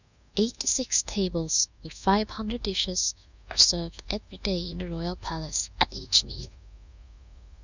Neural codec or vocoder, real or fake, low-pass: codec, 24 kHz, 1.2 kbps, DualCodec; fake; 7.2 kHz